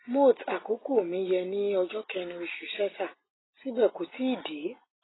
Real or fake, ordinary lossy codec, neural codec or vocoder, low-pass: real; AAC, 16 kbps; none; 7.2 kHz